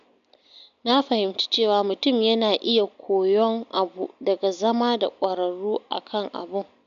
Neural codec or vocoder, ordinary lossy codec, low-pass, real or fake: none; MP3, 64 kbps; 7.2 kHz; real